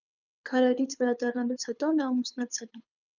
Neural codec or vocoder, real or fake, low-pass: codec, 24 kHz, 6 kbps, HILCodec; fake; 7.2 kHz